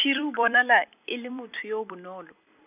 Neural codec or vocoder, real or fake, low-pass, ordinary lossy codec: vocoder, 44.1 kHz, 128 mel bands every 256 samples, BigVGAN v2; fake; 3.6 kHz; none